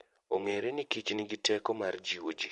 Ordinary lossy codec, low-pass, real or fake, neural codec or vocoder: MP3, 48 kbps; 14.4 kHz; fake; vocoder, 44.1 kHz, 128 mel bands every 512 samples, BigVGAN v2